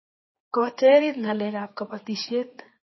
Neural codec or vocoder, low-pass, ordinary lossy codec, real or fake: codec, 16 kHz, 4 kbps, X-Codec, HuBERT features, trained on general audio; 7.2 kHz; MP3, 24 kbps; fake